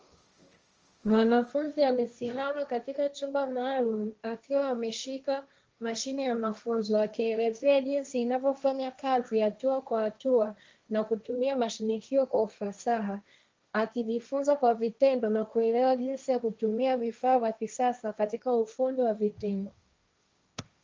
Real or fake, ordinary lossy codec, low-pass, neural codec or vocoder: fake; Opus, 24 kbps; 7.2 kHz; codec, 16 kHz, 1.1 kbps, Voila-Tokenizer